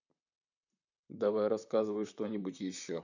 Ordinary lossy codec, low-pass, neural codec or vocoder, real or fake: none; 7.2 kHz; vocoder, 44.1 kHz, 128 mel bands, Pupu-Vocoder; fake